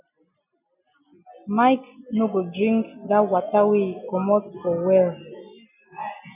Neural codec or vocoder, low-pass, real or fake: none; 3.6 kHz; real